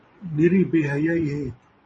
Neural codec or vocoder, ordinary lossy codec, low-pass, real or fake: vocoder, 24 kHz, 100 mel bands, Vocos; MP3, 32 kbps; 10.8 kHz; fake